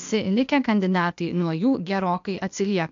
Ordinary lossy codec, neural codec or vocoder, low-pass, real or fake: MP3, 64 kbps; codec, 16 kHz, 0.8 kbps, ZipCodec; 7.2 kHz; fake